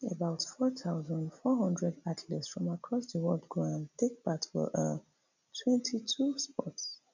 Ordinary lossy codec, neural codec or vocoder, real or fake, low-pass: none; none; real; 7.2 kHz